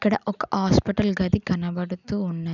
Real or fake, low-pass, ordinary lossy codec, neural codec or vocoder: real; 7.2 kHz; none; none